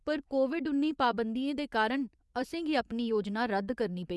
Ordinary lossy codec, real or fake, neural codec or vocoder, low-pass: none; fake; vocoder, 24 kHz, 100 mel bands, Vocos; none